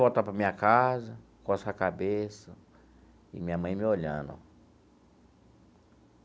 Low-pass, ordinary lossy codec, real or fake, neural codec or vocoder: none; none; real; none